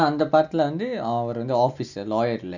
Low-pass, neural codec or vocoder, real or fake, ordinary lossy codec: 7.2 kHz; none; real; none